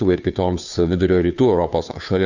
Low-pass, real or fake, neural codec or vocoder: 7.2 kHz; fake; codec, 16 kHz, 2 kbps, FunCodec, trained on Chinese and English, 25 frames a second